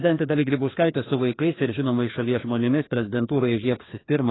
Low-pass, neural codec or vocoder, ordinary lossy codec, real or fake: 7.2 kHz; codec, 16 kHz, 2 kbps, FreqCodec, larger model; AAC, 16 kbps; fake